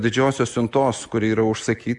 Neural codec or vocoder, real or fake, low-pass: none; real; 10.8 kHz